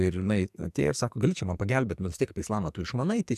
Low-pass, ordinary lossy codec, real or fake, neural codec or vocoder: 14.4 kHz; MP3, 96 kbps; fake; codec, 44.1 kHz, 2.6 kbps, SNAC